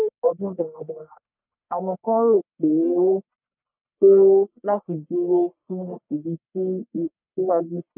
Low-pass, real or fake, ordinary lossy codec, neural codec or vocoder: 3.6 kHz; fake; none; codec, 44.1 kHz, 1.7 kbps, Pupu-Codec